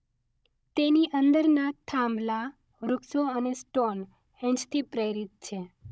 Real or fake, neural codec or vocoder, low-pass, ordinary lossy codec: fake; codec, 16 kHz, 16 kbps, FunCodec, trained on Chinese and English, 50 frames a second; none; none